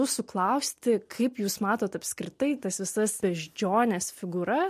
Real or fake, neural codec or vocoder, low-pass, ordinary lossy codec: real; none; 14.4 kHz; MP3, 64 kbps